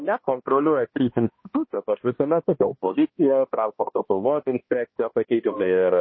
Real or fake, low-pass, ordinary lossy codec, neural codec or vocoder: fake; 7.2 kHz; MP3, 24 kbps; codec, 16 kHz, 1 kbps, X-Codec, HuBERT features, trained on balanced general audio